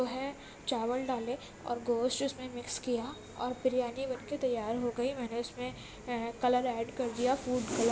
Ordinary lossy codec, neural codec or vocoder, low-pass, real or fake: none; none; none; real